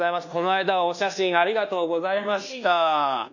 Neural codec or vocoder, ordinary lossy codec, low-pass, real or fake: codec, 24 kHz, 1.2 kbps, DualCodec; none; 7.2 kHz; fake